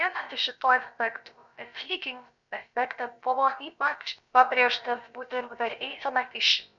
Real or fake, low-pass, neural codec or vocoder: fake; 7.2 kHz; codec, 16 kHz, about 1 kbps, DyCAST, with the encoder's durations